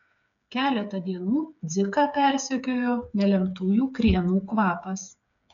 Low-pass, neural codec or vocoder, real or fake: 7.2 kHz; codec, 16 kHz, 8 kbps, FreqCodec, smaller model; fake